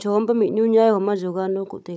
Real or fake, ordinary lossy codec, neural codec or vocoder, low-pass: fake; none; codec, 16 kHz, 16 kbps, FunCodec, trained on Chinese and English, 50 frames a second; none